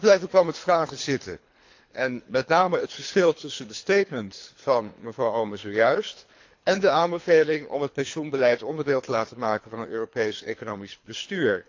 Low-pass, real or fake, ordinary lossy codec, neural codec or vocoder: 7.2 kHz; fake; AAC, 48 kbps; codec, 24 kHz, 3 kbps, HILCodec